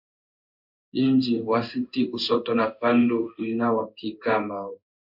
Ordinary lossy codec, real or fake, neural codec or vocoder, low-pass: MP3, 48 kbps; fake; codec, 16 kHz in and 24 kHz out, 1 kbps, XY-Tokenizer; 5.4 kHz